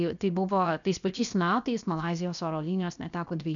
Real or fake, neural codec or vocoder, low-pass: fake; codec, 16 kHz, 0.7 kbps, FocalCodec; 7.2 kHz